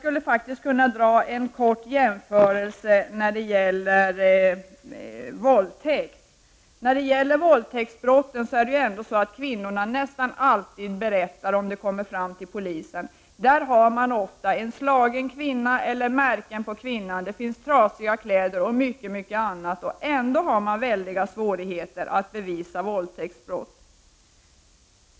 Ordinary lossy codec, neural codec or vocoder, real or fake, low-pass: none; none; real; none